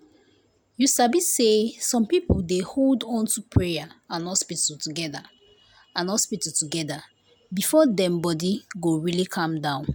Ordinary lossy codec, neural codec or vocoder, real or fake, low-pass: none; none; real; none